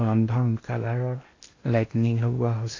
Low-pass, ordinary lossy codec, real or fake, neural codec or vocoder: 7.2 kHz; AAC, 32 kbps; fake; codec, 16 kHz in and 24 kHz out, 0.8 kbps, FocalCodec, streaming, 65536 codes